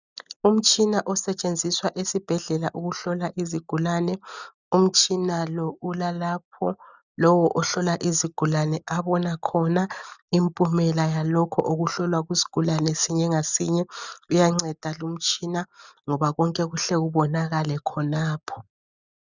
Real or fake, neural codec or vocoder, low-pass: real; none; 7.2 kHz